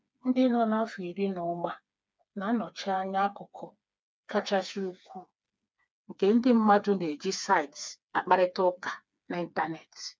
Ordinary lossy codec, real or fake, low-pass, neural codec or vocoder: none; fake; none; codec, 16 kHz, 4 kbps, FreqCodec, smaller model